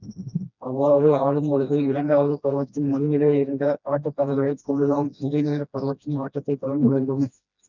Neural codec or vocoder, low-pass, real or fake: codec, 16 kHz, 1 kbps, FreqCodec, smaller model; 7.2 kHz; fake